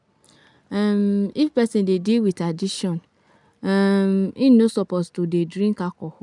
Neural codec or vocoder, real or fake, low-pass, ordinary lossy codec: none; real; 10.8 kHz; none